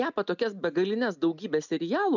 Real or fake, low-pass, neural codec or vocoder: real; 7.2 kHz; none